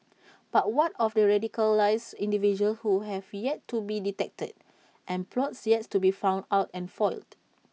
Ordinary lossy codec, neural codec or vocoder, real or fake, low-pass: none; none; real; none